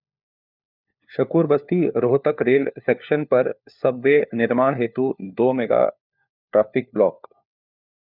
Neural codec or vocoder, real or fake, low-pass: codec, 16 kHz, 4 kbps, FunCodec, trained on LibriTTS, 50 frames a second; fake; 5.4 kHz